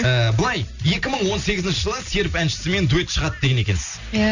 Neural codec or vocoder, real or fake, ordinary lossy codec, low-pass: none; real; none; 7.2 kHz